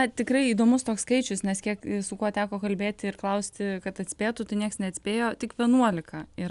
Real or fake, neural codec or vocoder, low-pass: real; none; 10.8 kHz